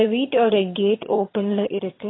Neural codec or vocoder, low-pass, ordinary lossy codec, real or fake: vocoder, 22.05 kHz, 80 mel bands, HiFi-GAN; 7.2 kHz; AAC, 16 kbps; fake